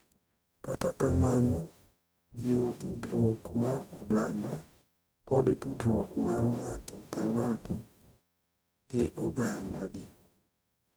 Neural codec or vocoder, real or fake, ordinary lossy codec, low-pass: codec, 44.1 kHz, 0.9 kbps, DAC; fake; none; none